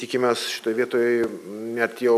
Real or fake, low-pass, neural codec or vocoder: real; 14.4 kHz; none